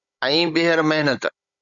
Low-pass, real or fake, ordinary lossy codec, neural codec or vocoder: 7.2 kHz; fake; Opus, 64 kbps; codec, 16 kHz, 16 kbps, FunCodec, trained on Chinese and English, 50 frames a second